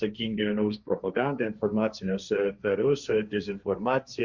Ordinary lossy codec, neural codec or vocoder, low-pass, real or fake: Opus, 64 kbps; codec, 16 kHz, 1.1 kbps, Voila-Tokenizer; 7.2 kHz; fake